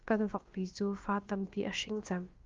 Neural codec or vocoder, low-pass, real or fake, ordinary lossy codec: codec, 16 kHz, about 1 kbps, DyCAST, with the encoder's durations; 7.2 kHz; fake; Opus, 32 kbps